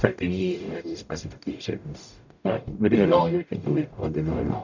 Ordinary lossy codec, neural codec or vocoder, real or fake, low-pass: none; codec, 44.1 kHz, 0.9 kbps, DAC; fake; 7.2 kHz